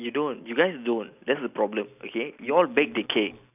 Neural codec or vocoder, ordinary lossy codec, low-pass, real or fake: none; none; 3.6 kHz; real